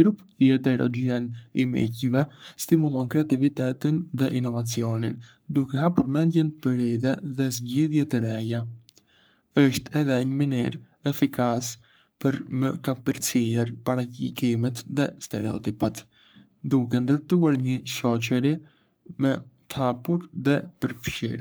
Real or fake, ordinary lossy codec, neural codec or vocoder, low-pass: fake; none; codec, 44.1 kHz, 3.4 kbps, Pupu-Codec; none